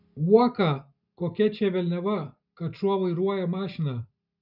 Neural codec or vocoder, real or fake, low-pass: none; real; 5.4 kHz